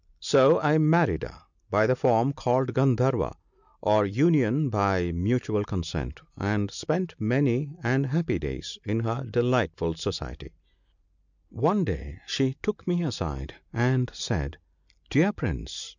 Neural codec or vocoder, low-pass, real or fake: none; 7.2 kHz; real